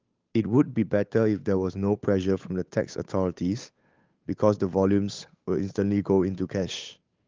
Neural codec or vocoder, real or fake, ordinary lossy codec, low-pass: codec, 16 kHz, 8 kbps, FunCodec, trained on Chinese and English, 25 frames a second; fake; Opus, 32 kbps; 7.2 kHz